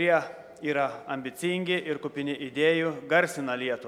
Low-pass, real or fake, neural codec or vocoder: 19.8 kHz; real; none